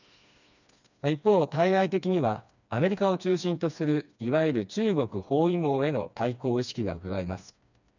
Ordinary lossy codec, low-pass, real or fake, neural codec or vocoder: none; 7.2 kHz; fake; codec, 16 kHz, 2 kbps, FreqCodec, smaller model